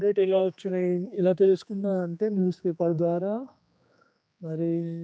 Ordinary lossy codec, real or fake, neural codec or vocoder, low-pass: none; fake; codec, 16 kHz, 2 kbps, X-Codec, HuBERT features, trained on general audio; none